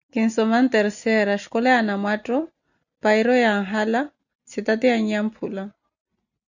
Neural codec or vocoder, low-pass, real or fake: none; 7.2 kHz; real